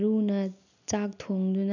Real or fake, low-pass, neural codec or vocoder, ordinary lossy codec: real; 7.2 kHz; none; none